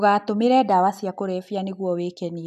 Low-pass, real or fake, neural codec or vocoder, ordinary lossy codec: 14.4 kHz; real; none; none